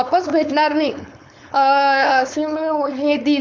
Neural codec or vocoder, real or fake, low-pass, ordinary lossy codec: codec, 16 kHz, 4.8 kbps, FACodec; fake; none; none